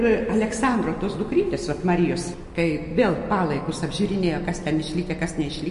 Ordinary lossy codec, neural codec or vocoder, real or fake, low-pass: MP3, 48 kbps; none; real; 10.8 kHz